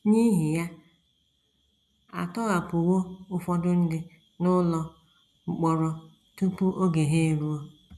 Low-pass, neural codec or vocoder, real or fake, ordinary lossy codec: none; none; real; none